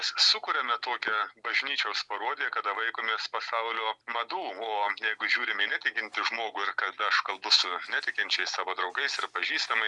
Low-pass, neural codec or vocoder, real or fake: 10.8 kHz; none; real